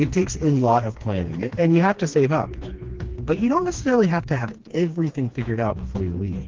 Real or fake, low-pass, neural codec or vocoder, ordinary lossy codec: fake; 7.2 kHz; codec, 16 kHz, 2 kbps, FreqCodec, smaller model; Opus, 16 kbps